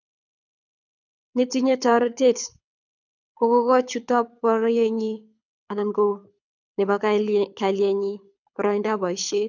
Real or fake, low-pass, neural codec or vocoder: fake; 7.2 kHz; codec, 24 kHz, 6 kbps, HILCodec